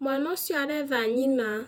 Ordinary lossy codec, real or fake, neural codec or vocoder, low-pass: none; fake; vocoder, 48 kHz, 128 mel bands, Vocos; 19.8 kHz